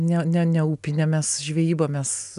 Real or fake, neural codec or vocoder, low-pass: real; none; 10.8 kHz